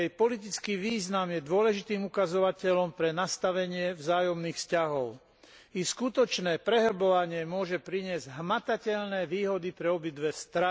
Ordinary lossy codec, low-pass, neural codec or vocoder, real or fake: none; none; none; real